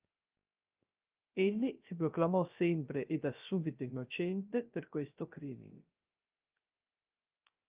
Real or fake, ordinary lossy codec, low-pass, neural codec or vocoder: fake; Opus, 32 kbps; 3.6 kHz; codec, 16 kHz, 0.3 kbps, FocalCodec